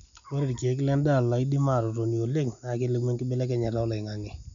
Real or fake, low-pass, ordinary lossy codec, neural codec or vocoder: real; 7.2 kHz; none; none